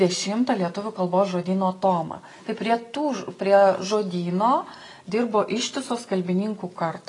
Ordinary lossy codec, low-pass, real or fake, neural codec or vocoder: AAC, 32 kbps; 10.8 kHz; real; none